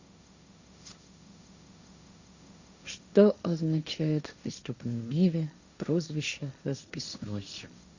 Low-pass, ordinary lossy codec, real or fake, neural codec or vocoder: 7.2 kHz; Opus, 64 kbps; fake; codec, 16 kHz, 1.1 kbps, Voila-Tokenizer